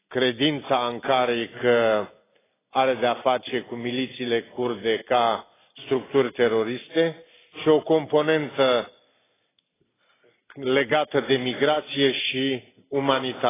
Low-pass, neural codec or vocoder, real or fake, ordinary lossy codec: 3.6 kHz; none; real; AAC, 16 kbps